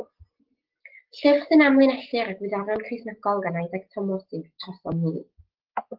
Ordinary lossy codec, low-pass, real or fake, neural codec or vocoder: Opus, 16 kbps; 5.4 kHz; real; none